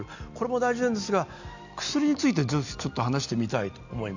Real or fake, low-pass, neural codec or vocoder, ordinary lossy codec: real; 7.2 kHz; none; none